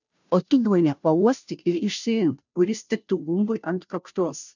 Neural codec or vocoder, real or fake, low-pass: codec, 16 kHz, 0.5 kbps, FunCodec, trained on Chinese and English, 25 frames a second; fake; 7.2 kHz